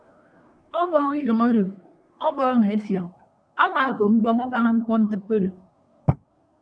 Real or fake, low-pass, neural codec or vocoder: fake; 9.9 kHz; codec, 24 kHz, 1 kbps, SNAC